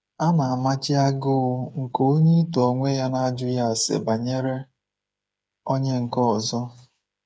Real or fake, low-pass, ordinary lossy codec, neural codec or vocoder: fake; none; none; codec, 16 kHz, 8 kbps, FreqCodec, smaller model